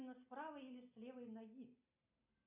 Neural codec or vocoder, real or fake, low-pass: none; real; 3.6 kHz